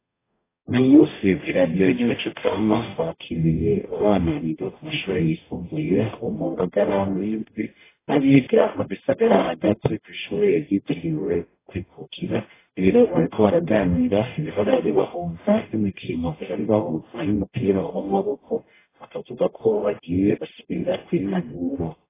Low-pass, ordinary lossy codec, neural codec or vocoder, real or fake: 3.6 kHz; AAC, 16 kbps; codec, 44.1 kHz, 0.9 kbps, DAC; fake